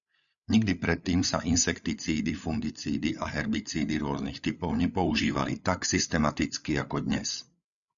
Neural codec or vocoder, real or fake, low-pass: codec, 16 kHz, 8 kbps, FreqCodec, larger model; fake; 7.2 kHz